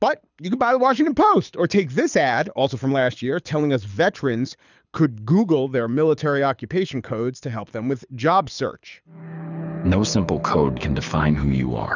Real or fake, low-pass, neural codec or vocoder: fake; 7.2 kHz; codec, 24 kHz, 6 kbps, HILCodec